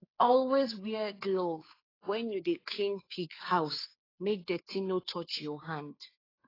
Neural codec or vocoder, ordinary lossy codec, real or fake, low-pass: codec, 16 kHz, 2 kbps, FunCodec, trained on Chinese and English, 25 frames a second; AAC, 24 kbps; fake; 5.4 kHz